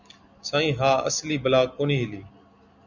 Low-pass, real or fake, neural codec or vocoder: 7.2 kHz; real; none